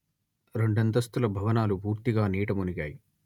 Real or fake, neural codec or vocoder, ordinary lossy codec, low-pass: fake; vocoder, 48 kHz, 128 mel bands, Vocos; none; 19.8 kHz